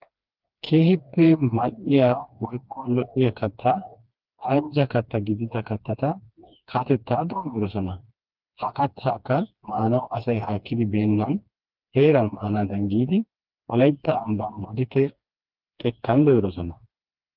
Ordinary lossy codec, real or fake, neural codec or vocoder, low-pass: Opus, 32 kbps; fake; codec, 16 kHz, 2 kbps, FreqCodec, smaller model; 5.4 kHz